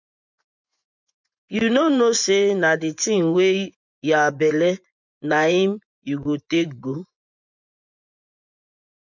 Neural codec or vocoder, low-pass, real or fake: vocoder, 24 kHz, 100 mel bands, Vocos; 7.2 kHz; fake